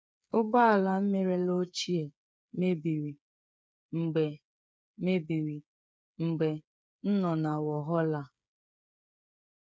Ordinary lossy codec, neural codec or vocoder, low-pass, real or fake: none; codec, 16 kHz, 8 kbps, FreqCodec, smaller model; none; fake